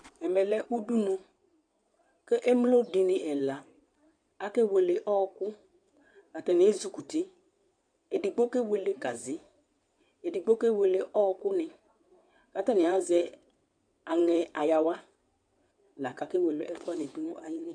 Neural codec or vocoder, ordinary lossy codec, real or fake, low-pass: codec, 16 kHz in and 24 kHz out, 2.2 kbps, FireRedTTS-2 codec; MP3, 96 kbps; fake; 9.9 kHz